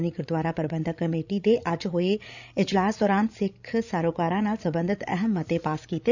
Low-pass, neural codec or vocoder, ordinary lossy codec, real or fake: 7.2 kHz; codec, 16 kHz, 16 kbps, FreqCodec, larger model; none; fake